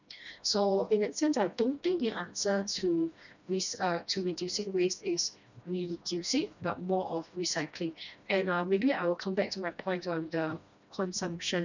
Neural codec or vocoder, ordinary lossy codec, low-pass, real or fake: codec, 16 kHz, 1 kbps, FreqCodec, smaller model; none; 7.2 kHz; fake